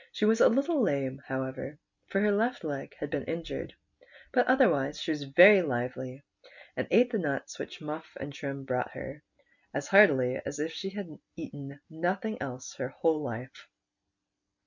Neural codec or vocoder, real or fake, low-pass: none; real; 7.2 kHz